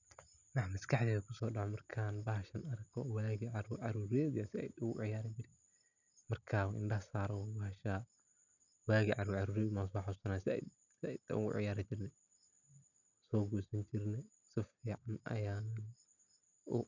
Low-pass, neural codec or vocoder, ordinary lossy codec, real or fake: 7.2 kHz; none; none; real